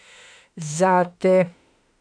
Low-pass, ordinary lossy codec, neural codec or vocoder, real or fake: 9.9 kHz; none; autoencoder, 48 kHz, 32 numbers a frame, DAC-VAE, trained on Japanese speech; fake